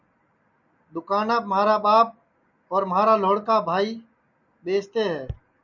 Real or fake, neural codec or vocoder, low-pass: real; none; 7.2 kHz